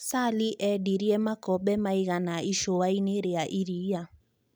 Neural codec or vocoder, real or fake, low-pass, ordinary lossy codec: none; real; none; none